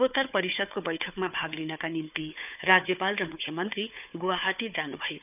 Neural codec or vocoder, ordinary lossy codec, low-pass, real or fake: codec, 16 kHz, 4 kbps, FunCodec, trained on Chinese and English, 50 frames a second; none; 3.6 kHz; fake